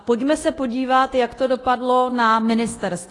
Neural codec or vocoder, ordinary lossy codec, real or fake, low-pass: codec, 24 kHz, 0.9 kbps, DualCodec; AAC, 32 kbps; fake; 10.8 kHz